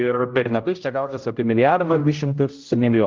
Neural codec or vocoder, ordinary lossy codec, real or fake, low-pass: codec, 16 kHz, 0.5 kbps, X-Codec, HuBERT features, trained on general audio; Opus, 32 kbps; fake; 7.2 kHz